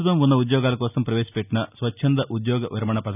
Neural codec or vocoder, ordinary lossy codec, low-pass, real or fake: none; none; 3.6 kHz; real